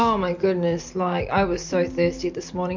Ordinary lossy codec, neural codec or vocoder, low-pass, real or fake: MP3, 48 kbps; none; 7.2 kHz; real